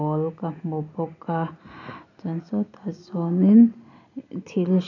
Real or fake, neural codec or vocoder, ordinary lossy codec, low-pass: real; none; none; 7.2 kHz